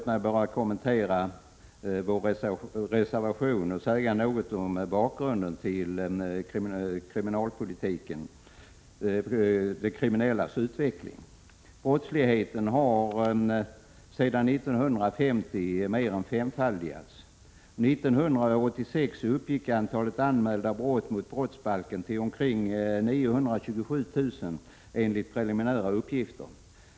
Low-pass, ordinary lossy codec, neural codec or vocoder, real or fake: none; none; none; real